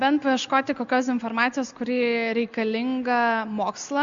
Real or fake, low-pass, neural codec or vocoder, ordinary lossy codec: real; 7.2 kHz; none; Opus, 64 kbps